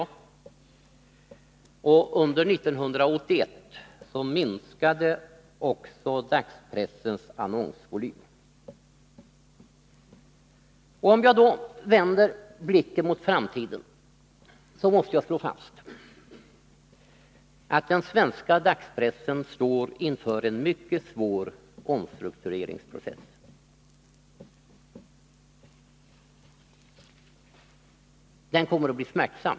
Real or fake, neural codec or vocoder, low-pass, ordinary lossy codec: real; none; none; none